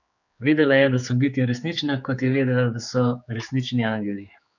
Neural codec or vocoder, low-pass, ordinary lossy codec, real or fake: codec, 16 kHz, 4 kbps, X-Codec, HuBERT features, trained on general audio; 7.2 kHz; none; fake